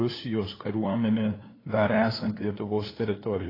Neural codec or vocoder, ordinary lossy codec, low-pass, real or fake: codec, 16 kHz, 2 kbps, FunCodec, trained on LibriTTS, 25 frames a second; AAC, 24 kbps; 5.4 kHz; fake